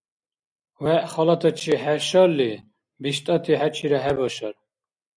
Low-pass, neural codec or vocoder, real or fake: 9.9 kHz; none; real